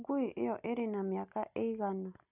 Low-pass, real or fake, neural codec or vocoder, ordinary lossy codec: 3.6 kHz; real; none; none